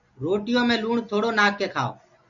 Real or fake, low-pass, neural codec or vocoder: real; 7.2 kHz; none